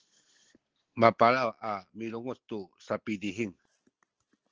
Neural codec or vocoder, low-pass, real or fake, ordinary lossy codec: none; 7.2 kHz; real; Opus, 16 kbps